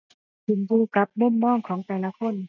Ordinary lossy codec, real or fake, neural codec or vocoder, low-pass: none; real; none; 7.2 kHz